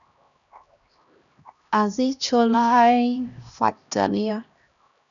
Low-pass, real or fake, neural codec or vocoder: 7.2 kHz; fake; codec, 16 kHz, 1 kbps, X-Codec, HuBERT features, trained on LibriSpeech